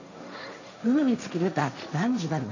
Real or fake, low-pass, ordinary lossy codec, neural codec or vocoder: fake; 7.2 kHz; none; codec, 16 kHz, 1.1 kbps, Voila-Tokenizer